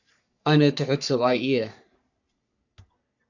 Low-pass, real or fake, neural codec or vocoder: 7.2 kHz; fake; codec, 44.1 kHz, 3.4 kbps, Pupu-Codec